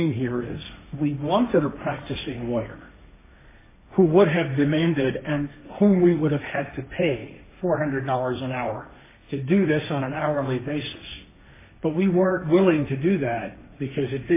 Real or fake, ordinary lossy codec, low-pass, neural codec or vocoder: fake; MP3, 16 kbps; 3.6 kHz; codec, 16 kHz, 1.1 kbps, Voila-Tokenizer